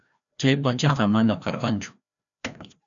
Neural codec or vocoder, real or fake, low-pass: codec, 16 kHz, 1 kbps, FreqCodec, larger model; fake; 7.2 kHz